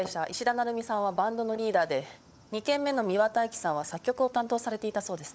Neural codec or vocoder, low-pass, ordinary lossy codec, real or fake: codec, 16 kHz, 16 kbps, FunCodec, trained on LibriTTS, 50 frames a second; none; none; fake